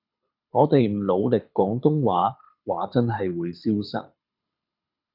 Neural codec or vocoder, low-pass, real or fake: codec, 24 kHz, 6 kbps, HILCodec; 5.4 kHz; fake